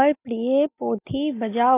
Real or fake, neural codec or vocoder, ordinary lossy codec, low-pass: real; none; AAC, 24 kbps; 3.6 kHz